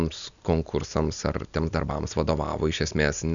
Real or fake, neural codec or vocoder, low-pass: real; none; 7.2 kHz